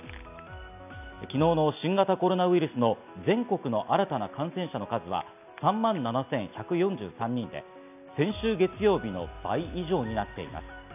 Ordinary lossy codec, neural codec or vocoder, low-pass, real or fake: none; none; 3.6 kHz; real